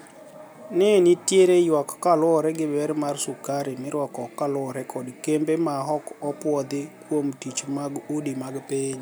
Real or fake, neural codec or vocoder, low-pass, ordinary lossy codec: real; none; none; none